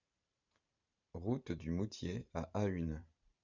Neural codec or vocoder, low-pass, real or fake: vocoder, 44.1 kHz, 128 mel bands every 512 samples, BigVGAN v2; 7.2 kHz; fake